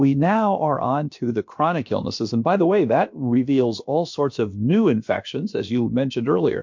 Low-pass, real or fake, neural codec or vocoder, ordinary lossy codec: 7.2 kHz; fake; codec, 16 kHz, about 1 kbps, DyCAST, with the encoder's durations; MP3, 48 kbps